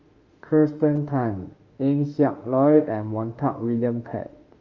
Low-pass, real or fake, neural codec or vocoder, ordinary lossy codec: 7.2 kHz; fake; autoencoder, 48 kHz, 32 numbers a frame, DAC-VAE, trained on Japanese speech; Opus, 32 kbps